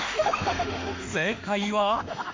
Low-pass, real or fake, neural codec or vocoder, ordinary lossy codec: 7.2 kHz; fake; autoencoder, 48 kHz, 32 numbers a frame, DAC-VAE, trained on Japanese speech; MP3, 48 kbps